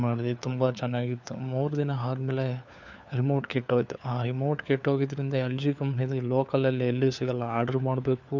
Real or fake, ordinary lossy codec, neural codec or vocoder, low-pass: fake; none; codec, 16 kHz, 4 kbps, FunCodec, trained on LibriTTS, 50 frames a second; 7.2 kHz